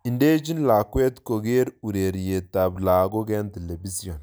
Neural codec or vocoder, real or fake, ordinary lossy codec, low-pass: none; real; none; none